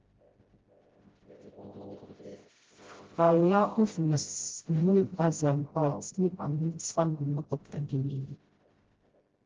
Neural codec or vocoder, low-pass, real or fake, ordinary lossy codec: codec, 16 kHz, 0.5 kbps, FreqCodec, smaller model; 7.2 kHz; fake; Opus, 16 kbps